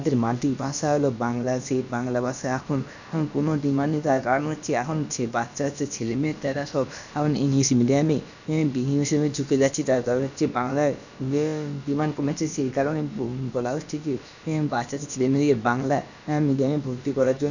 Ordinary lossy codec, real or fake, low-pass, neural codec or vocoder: none; fake; 7.2 kHz; codec, 16 kHz, about 1 kbps, DyCAST, with the encoder's durations